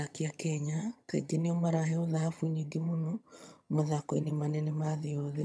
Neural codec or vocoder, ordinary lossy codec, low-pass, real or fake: vocoder, 22.05 kHz, 80 mel bands, HiFi-GAN; none; none; fake